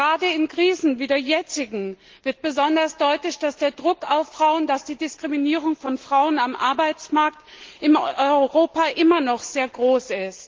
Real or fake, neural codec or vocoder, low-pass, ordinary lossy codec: real; none; 7.2 kHz; Opus, 16 kbps